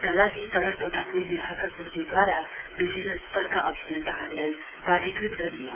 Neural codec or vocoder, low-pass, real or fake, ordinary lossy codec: codec, 16 kHz, 4 kbps, FreqCodec, smaller model; 3.6 kHz; fake; none